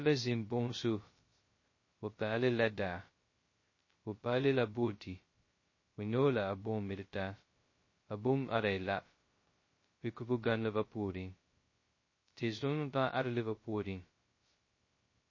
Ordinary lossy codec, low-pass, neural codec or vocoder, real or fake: MP3, 32 kbps; 7.2 kHz; codec, 16 kHz, 0.2 kbps, FocalCodec; fake